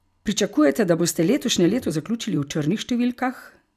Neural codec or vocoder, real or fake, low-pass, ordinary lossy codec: none; real; 14.4 kHz; none